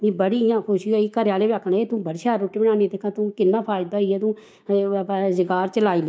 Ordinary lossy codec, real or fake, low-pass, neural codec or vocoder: none; fake; none; codec, 16 kHz, 6 kbps, DAC